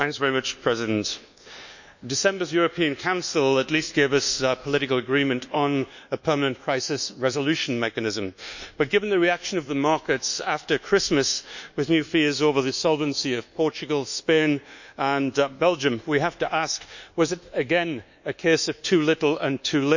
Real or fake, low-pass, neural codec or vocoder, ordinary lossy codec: fake; 7.2 kHz; codec, 24 kHz, 1.2 kbps, DualCodec; none